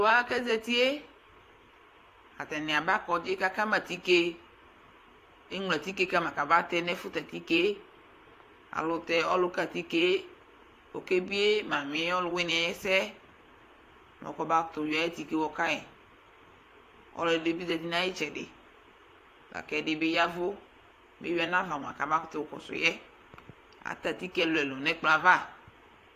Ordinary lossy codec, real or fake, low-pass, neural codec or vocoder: AAC, 64 kbps; fake; 14.4 kHz; vocoder, 44.1 kHz, 128 mel bands, Pupu-Vocoder